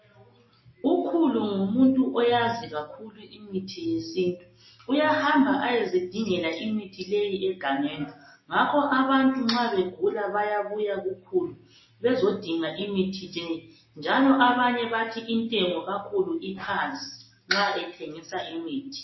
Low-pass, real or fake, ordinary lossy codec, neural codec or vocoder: 7.2 kHz; real; MP3, 24 kbps; none